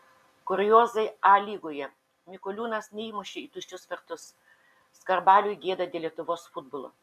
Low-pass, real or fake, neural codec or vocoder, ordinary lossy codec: 14.4 kHz; real; none; MP3, 96 kbps